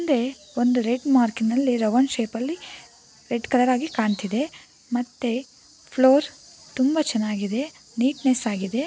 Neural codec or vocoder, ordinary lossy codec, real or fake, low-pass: none; none; real; none